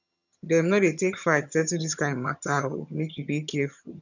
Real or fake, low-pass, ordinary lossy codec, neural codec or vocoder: fake; 7.2 kHz; none; vocoder, 22.05 kHz, 80 mel bands, HiFi-GAN